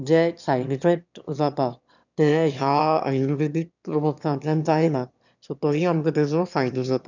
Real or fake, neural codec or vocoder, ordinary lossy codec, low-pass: fake; autoencoder, 22.05 kHz, a latent of 192 numbers a frame, VITS, trained on one speaker; none; 7.2 kHz